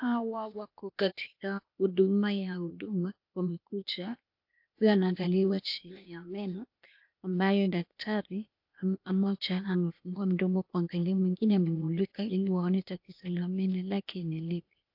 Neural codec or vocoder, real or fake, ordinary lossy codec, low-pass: codec, 16 kHz, 0.8 kbps, ZipCodec; fake; AAC, 48 kbps; 5.4 kHz